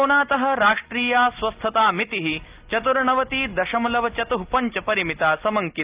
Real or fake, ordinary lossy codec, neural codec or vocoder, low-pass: real; Opus, 32 kbps; none; 3.6 kHz